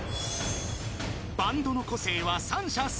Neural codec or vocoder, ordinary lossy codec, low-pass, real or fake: none; none; none; real